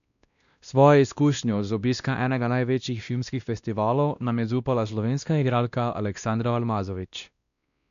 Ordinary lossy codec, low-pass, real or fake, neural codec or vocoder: none; 7.2 kHz; fake; codec, 16 kHz, 1 kbps, X-Codec, WavLM features, trained on Multilingual LibriSpeech